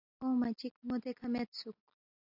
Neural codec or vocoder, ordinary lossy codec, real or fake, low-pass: none; AAC, 48 kbps; real; 5.4 kHz